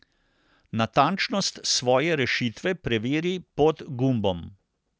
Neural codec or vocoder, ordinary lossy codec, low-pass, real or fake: none; none; none; real